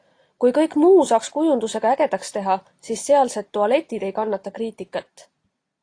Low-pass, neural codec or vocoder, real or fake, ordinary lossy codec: 9.9 kHz; none; real; AAC, 48 kbps